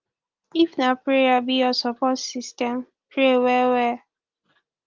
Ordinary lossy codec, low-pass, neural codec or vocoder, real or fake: Opus, 32 kbps; 7.2 kHz; none; real